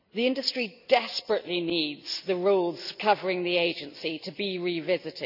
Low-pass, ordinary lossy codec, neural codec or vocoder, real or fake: 5.4 kHz; none; none; real